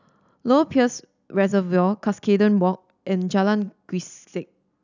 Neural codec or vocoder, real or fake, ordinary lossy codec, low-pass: none; real; none; 7.2 kHz